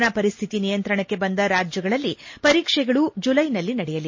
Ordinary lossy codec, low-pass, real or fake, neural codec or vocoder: MP3, 32 kbps; 7.2 kHz; real; none